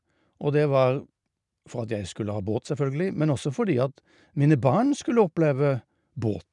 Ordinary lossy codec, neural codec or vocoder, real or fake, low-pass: none; none; real; 10.8 kHz